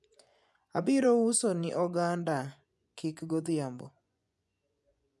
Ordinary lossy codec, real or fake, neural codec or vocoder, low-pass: none; fake; vocoder, 24 kHz, 100 mel bands, Vocos; none